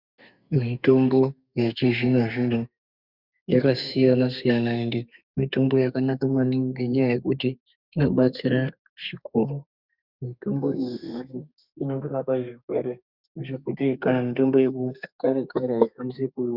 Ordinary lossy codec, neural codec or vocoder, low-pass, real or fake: Opus, 64 kbps; codec, 32 kHz, 1.9 kbps, SNAC; 5.4 kHz; fake